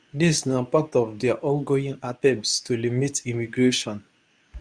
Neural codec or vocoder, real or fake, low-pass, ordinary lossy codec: codec, 24 kHz, 0.9 kbps, WavTokenizer, medium speech release version 2; fake; 9.9 kHz; Opus, 64 kbps